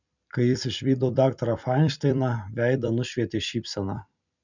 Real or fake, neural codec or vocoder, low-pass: fake; vocoder, 44.1 kHz, 128 mel bands every 256 samples, BigVGAN v2; 7.2 kHz